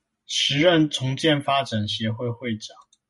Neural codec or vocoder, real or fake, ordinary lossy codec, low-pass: none; real; MP3, 48 kbps; 14.4 kHz